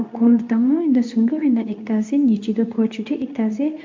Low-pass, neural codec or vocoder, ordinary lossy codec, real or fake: 7.2 kHz; codec, 24 kHz, 0.9 kbps, WavTokenizer, medium speech release version 2; MP3, 64 kbps; fake